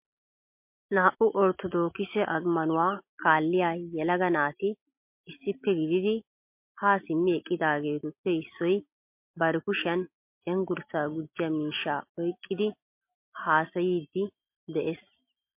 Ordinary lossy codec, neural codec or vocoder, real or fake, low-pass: MP3, 32 kbps; none; real; 3.6 kHz